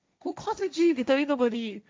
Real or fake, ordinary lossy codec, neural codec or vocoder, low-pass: fake; none; codec, 16 kHz, 1.1 kbps, Voila-Tokenizer; none